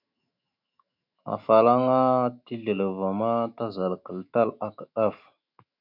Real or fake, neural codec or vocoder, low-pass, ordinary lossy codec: fake; autoencoder, 48 kHz, 128 numbers a frame, DAC-VAE, trained on Japanese speech; 5.4 kHz; MP3, 48 kbps